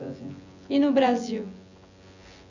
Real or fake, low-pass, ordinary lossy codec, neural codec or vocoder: fake; 7.2 kHz; none; vocoder, 24 kHz, 100 mel bands, Vocos